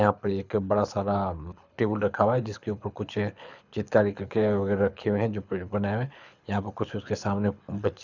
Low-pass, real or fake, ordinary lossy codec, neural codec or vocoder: 7.2 kHz; fake; none; codec, 24 kHz, 6 kbps, HILCodec